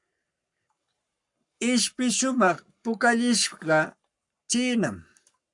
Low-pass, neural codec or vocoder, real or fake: 10.8 kHz; codec, 44.1 kHz, 7.8 kbps, Pupu-Codec; fake